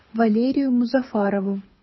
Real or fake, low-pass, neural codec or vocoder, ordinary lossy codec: fake; 7.2 kHz; codec, 24 kHz, 6 kbps, HILCodec; MP3, 24 kbps